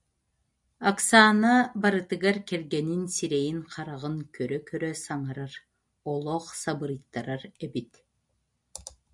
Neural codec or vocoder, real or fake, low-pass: none; real; 10.8 kHz